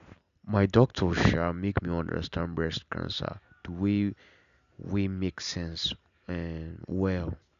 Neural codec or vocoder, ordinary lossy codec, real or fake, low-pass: none; none; real; 7.2 kHz